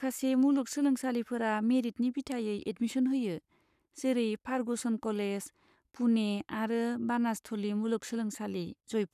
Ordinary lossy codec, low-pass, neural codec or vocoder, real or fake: none; 14.4 kHz; codec, 44.1 kHz, 7.8 kbps, Pupu-Codec; fake